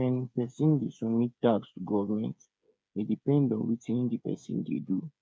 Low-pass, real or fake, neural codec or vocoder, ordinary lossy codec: none; fake; codec, 16 kHz, 8 kbps, FreqCodec, smaller model; none